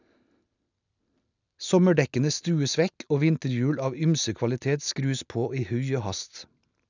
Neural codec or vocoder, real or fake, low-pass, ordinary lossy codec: none; real; 7.2 kHz; none